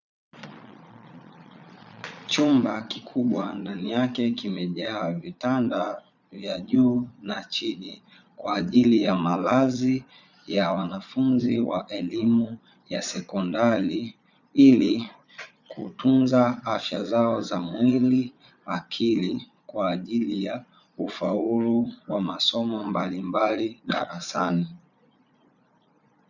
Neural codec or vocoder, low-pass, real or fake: vocoder, 22.05 kHz, 80 mel bands, Vocos; 7.2 kHz; fake